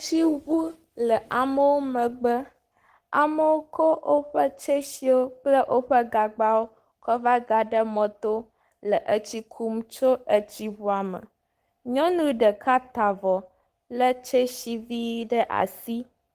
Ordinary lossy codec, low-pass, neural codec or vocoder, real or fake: Opus, 24 kbps; 14.4 kHz; codec, 44.1 kHz, 7.8 kbps, Pupu-Codec; fake